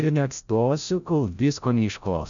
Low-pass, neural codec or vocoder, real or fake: 7.2 kHz; codec, 16 kHz, 0.5 kbps, FreqCodec, larger model; fake